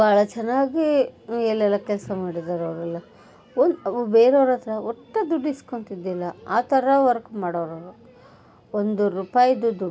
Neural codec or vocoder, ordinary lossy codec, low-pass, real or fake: none; none; none; real